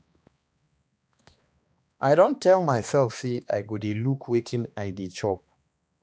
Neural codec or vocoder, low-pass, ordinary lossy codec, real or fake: codec, 16 kHz, 2 kbps, X-Codec, HuBERT features, trained on balanced general audio; none; none; fake